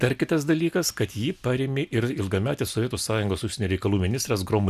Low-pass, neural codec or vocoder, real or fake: 14.4 kHz; none; real